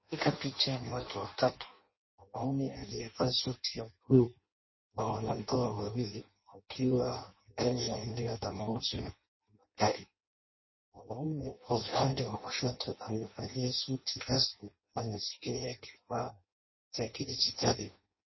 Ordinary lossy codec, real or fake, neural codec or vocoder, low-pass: MP3, 24 kbps; fake; codec, 16 kHz in and 24 kHz out, 0.6 kbps, FireRedTTS-2 codec; 7.2 kHz